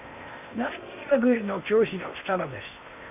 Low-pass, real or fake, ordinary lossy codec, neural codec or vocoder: 3.6 kHz; fake; none; codec, 16 kHz in and 24 kHz out, 0.8 kbps, FocalCodec, streaming, 65536 codes